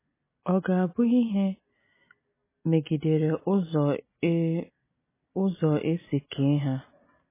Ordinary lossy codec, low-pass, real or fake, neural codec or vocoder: MP3, 16 kbps; 3.6 kHz; real; none